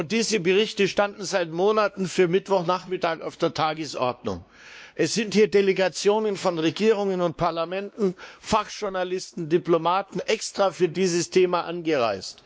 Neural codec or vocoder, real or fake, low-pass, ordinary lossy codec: codec, 16 kHz, 2 kbps, X-Codec, WavLM features, trained on Multilingual LibriSpeech; fake; none; none